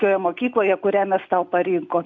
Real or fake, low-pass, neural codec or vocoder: real; 7.2 kHz; none